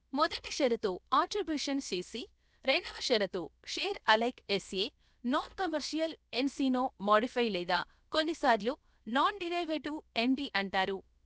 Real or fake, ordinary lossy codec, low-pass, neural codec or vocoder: fake; none; none; codec, 16 kHz, 0.7 kbps, FocalCodec